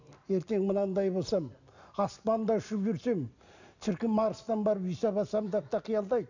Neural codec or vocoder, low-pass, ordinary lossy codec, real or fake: none; 7.2 kHz; none; real